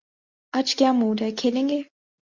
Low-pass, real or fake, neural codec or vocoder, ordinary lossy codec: 7.2 kHz; real; none; Opus, 64 kbps